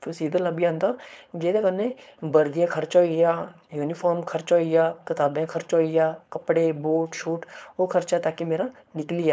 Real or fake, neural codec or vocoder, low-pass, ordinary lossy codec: fake; codec, 16 kHz, 4.8 kbps, FACodec; none; none